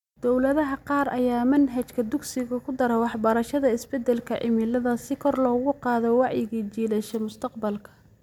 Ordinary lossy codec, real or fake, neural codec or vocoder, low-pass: MP3, 96 kbps; real; none; 19.8 kHz